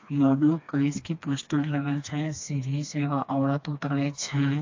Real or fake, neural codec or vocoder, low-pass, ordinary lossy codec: fake; codec, 16 kHz, 2 kbps, FreqCodec, smaller model; 7.2 kHz; AAC, 48 kbps